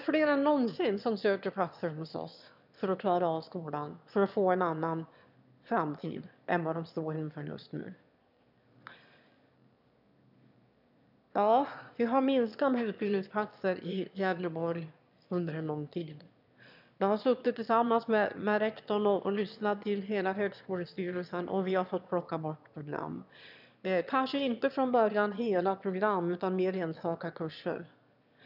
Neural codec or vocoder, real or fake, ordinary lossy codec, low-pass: autoencoder, 22.05 kHz, a latent of 192 numbers a frame, VITS, trained on one speaker; fake; none; 5.4 kHz